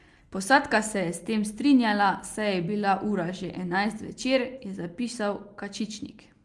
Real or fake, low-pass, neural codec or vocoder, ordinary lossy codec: real; 10.8 kHz; none; Opus, 32 kbps